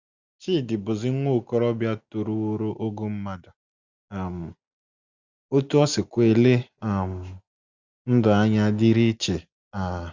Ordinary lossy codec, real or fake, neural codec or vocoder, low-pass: Opus, 64 kbps; real; none; 7.2 kHz